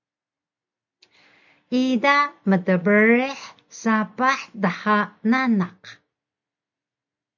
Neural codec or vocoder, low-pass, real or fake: none; 7.2 kHz; real